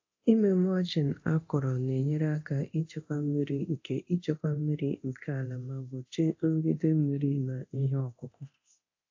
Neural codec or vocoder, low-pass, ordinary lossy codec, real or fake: codec, 24 kHz, 0.9 kbps, DualCodec; 7.2 kHz; AAC, 48 kbps; fake